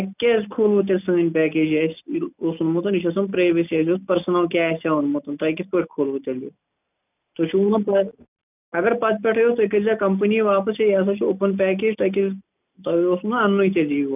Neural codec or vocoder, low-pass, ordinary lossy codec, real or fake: none; 3.6 kHz; none; real